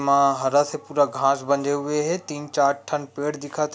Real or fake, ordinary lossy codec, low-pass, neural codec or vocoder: real; none; none; none